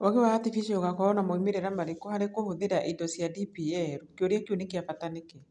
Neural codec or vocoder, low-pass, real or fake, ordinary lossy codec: none; 10.8 kHz; real; none